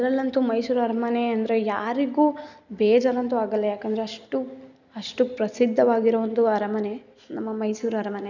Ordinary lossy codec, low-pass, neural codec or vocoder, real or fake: none; 7.2 kHz; none; real